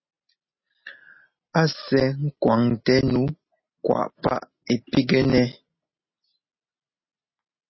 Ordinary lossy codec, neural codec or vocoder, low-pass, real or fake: MP3, 24 kbps; none; 7.2 kHz; real